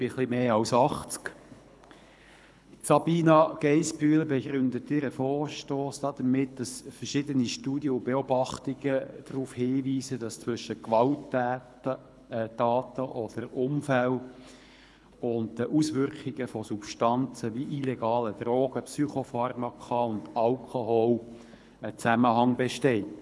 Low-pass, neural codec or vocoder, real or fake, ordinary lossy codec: none; codec, 24 kHz, 6 kbps, HILCodec; fake; none